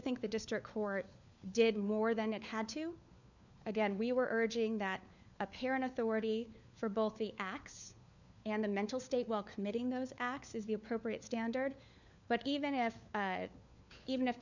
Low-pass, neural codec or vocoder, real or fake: 7.2 kHz; codec, 16 kHz, 4 kbps, FunCodec, trained on LibriTTS, 50 frames a second; fake